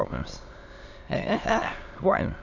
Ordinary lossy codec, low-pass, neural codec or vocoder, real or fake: AAC, 48 kbps; 7.2 kHz; autoencoder, 22.05 kHz, a latent of 192 numbers a frame, VITS, trained on many speakers; fake